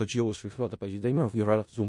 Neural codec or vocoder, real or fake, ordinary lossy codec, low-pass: codec, 16 kHz in and 24 kHz out, 0.4 kbps, LongCat-Audio-Codec, four codebook decoder; fake; MP3, 48 kbps; 10.8 kHz